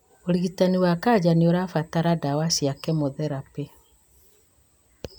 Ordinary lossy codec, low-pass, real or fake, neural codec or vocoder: none; none; real; none